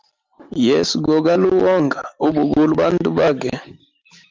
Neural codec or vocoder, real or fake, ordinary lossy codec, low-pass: none; real; Opus, 24 kbps; 7.2 kHz